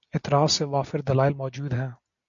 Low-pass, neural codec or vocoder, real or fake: 7.2 kHz; none; real